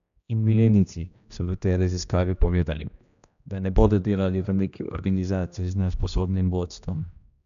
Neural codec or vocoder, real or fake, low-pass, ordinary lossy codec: codec, 16 kHz, 1 kbps, X-Codec, HuBERT features, trained on general audio; fake; 7.2 kHz; none